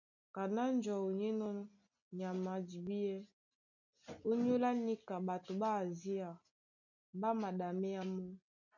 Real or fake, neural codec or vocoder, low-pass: real; none; 7.2 kHz